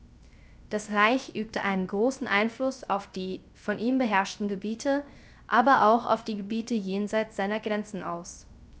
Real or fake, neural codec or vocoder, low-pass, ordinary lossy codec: fake; codec, 16 kHz, 0.3 kbps, FocalCodec; none; none